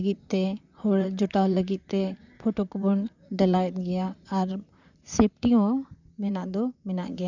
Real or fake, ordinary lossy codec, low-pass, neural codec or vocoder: fake; none; 7.2 kHz; vocoder, 44.1 kHz, 128 mel bands, Pupu-Vocoder